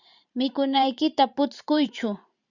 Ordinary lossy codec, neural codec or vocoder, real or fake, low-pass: Opus, 64 kbps; vocoder, 44.1 kHz, 128 mel bands every 512 samples, BigVGAN v2; fake; 7.2 kHz